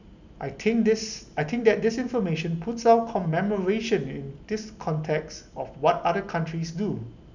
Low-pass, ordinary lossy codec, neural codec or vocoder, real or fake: 7.2 kHz; none; none; real